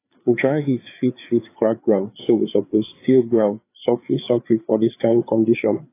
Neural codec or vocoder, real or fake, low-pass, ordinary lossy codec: codec, 16 kHz, 4 kbps, FreqCodec, larger model; fake; 3.6 kHz; AAC, 24 kbps